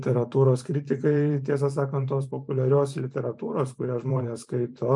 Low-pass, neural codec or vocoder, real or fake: 10.8 kHz; vocoder, 44.1 kHz, 128 mel bands every 512 samples, BigVGAN v2; fake